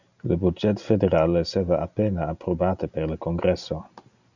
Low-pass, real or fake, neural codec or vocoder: 7.2 kHz; real; none